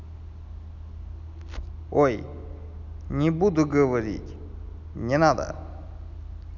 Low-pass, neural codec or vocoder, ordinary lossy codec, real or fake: 7.2 kHz; none; none; real